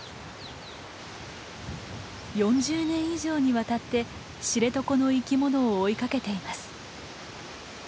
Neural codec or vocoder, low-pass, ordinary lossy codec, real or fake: none; none; none; real